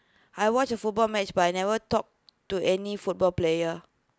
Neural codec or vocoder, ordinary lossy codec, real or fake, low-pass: none; none; real; none